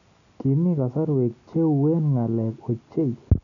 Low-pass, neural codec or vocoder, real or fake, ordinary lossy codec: 7.2 kHz; none; real; none